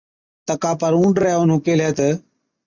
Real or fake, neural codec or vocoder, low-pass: real; none; 7.2 kHz